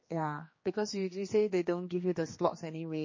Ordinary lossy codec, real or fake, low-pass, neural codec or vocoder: MP3, 32 kbps; fake; 7.2 kHz; codec, 16 kHz, 2 kbps, X-Codec, HuBERT features, trained on general audio